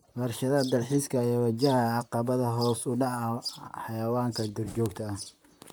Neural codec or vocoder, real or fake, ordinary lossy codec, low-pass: vocoder, 44.1 kHz, 128 mel bands, Pupu-Vocoder; fake; none; none